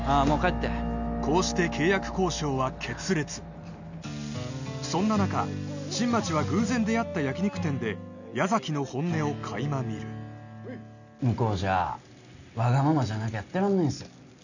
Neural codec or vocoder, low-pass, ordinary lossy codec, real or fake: none; 7.2 kHz; none; real